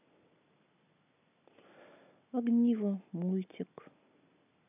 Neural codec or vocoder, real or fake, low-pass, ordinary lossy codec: none; real; 3.6 kHz; none